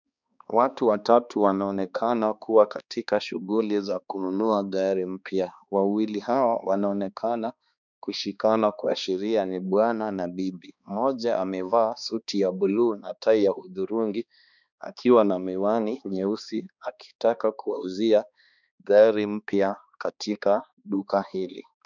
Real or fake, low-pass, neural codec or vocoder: fake; 7.2 kHz; codec, 16 kHz, 2 kbps, X-Codec, HuBERT features, trained on balanced general audio